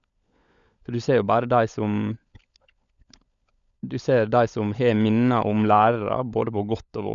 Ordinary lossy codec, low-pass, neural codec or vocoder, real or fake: none; 7.2 kHz; codec, 16 kHz, 16 kbps, FunCodec, trained on LibriTTS, 50 frames a second; fake